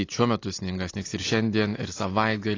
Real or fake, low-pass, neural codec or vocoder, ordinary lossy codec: real; 7.2 kHz; none; AAC, 32 kbps